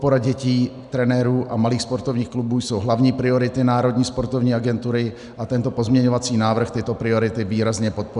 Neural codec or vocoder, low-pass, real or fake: none; 10.8 kHz; real